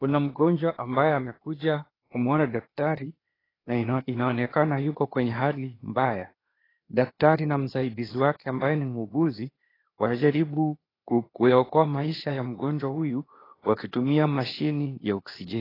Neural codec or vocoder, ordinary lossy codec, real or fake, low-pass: codec, 16 kHz, 0.8 kbps, ZipCodec; AAC, 24 kbps; fake; 5.4 kHz